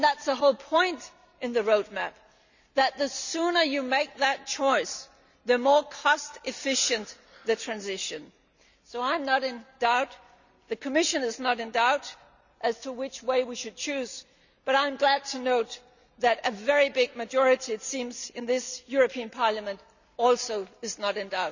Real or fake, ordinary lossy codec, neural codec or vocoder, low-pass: real; none; none; 7.2 kHz